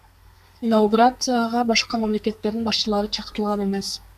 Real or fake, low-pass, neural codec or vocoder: fake; 14.4 kHz; codec, 32 kHz, 1.9 kbps, SNAC